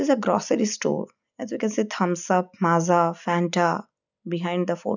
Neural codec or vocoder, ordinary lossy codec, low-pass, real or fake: none; none; 7.2 kHz; real